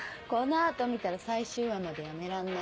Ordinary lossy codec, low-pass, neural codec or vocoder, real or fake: none; none; none; real